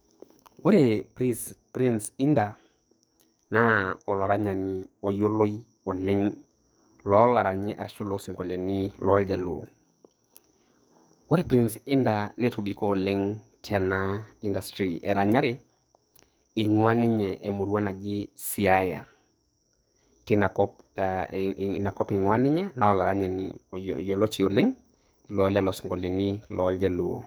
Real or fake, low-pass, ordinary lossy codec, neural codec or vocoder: fake; none; none; codec, 44.1 kHz, 2.6 kbps, SNAC